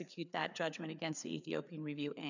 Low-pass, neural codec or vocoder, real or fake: 7.2 kHz; codec, 16 kHz, 4 kbps, FreqCodec, larger model; fake